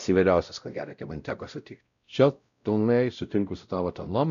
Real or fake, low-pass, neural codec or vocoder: fake; 7.2 kHz; codec, 16 kHz, 0.5 kbps, X-Codec, WavLM features, trained on Multilingual LibriSpeech